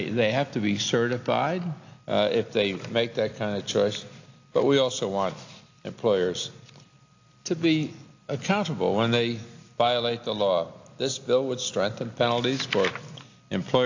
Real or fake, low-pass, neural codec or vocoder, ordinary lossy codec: real; 7.2 kHz; none; AAC, 48 kbps